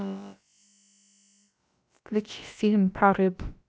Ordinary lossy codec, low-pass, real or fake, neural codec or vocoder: none; none; fake; codec, 16 kHz, about 1 kbps, DyCAST, with the encoder's durations